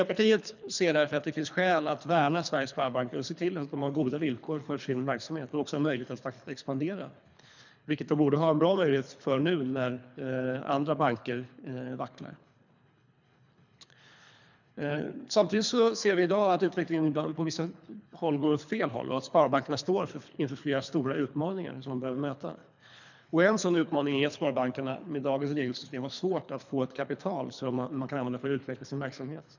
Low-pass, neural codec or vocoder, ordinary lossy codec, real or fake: 7.2 kHz; codec, 24 kHz, 3 kbps, HILCodec; none; fake